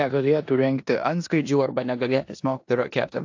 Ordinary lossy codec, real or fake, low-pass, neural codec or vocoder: AAC, 48 kbps; fake; 7.2 kHz; codec, 16 kHz in and 24 kHz out, 0.9 kbps, LongCat-Audio-Codec, four codebook decoder